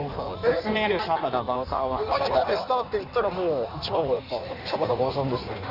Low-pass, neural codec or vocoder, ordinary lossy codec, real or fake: 5.4 kHz; codec, 16 kHz in and 24 kHz out, 1.1 kbps, FireRedTTS-2 codec; none; fake